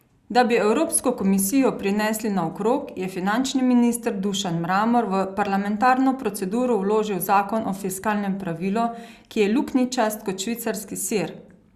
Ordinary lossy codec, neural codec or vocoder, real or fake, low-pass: Opus, 64 kbps; none; real; 14.4 kHz